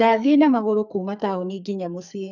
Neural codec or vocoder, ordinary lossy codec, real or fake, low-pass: codec, 44.1 kHz, 2.6 kbps, SNAC; none; fake; 7.2 kHz